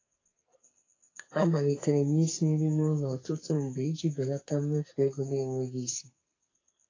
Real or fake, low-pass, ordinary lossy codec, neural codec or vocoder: fake; 7.2 kHz; AAC, 32 kbps; codec, 44.1 kHz, 2.6 kbps, SNAC